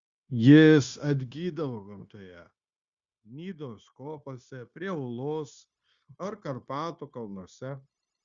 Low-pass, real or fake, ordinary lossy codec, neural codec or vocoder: 7.2 kHz; fake; Opus, 64 kbps; codec, 16 kHz, 0.9 kbps, LongCat-Audio-Codec